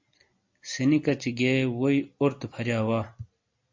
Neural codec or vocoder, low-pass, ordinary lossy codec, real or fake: none; 7.2 kHz; MP3, 48 kbps; real